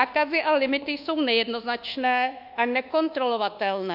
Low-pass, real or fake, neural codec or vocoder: 5.4 kHz; fake; codec, 24 kHz, 1.2 kbps, DualCodec